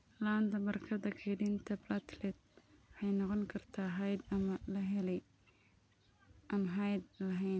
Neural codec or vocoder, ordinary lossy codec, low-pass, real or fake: none; none; none; real